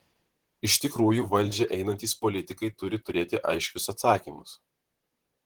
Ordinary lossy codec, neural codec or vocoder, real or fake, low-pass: Opus, 16 kbps; vocoder, 44.1 kHz, 128 mel bands, Pupu-Vocoder; fake; 19.8 kHz